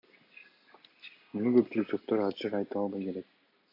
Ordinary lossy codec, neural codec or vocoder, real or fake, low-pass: MP3, 32 kbps; none; real; 5.4 kHz